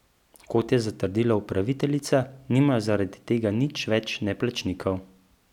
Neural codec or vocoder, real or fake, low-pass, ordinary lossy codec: none; real; 19.8 kHz; none